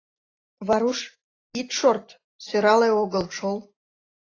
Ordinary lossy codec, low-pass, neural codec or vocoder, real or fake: AAC, 32 kbps; 7.2 kHz; none; real